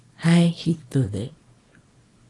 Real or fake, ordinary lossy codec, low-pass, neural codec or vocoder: fake; AAC, 32 kbps; 10.8 kHz; codec, 24 kHz, 0.9 kbps, WavTokenizer, small release